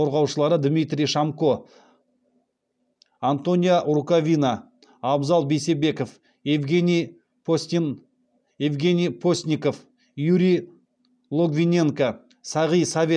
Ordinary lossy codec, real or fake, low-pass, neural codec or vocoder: none; real; 9.9 kHz; none